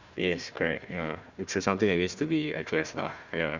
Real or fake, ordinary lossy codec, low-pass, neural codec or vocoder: fake; Opus, 64 kbps; 7.2 kHz; codec, 16 kHz, 1 kbps, FunCodec, trained on Chinese and English, 50 frames a second